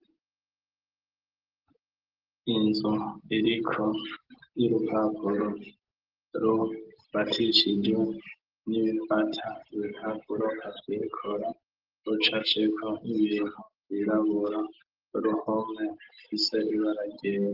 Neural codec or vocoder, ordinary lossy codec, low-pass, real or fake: none; Opus, 16 kbps; 5.4 kHz; real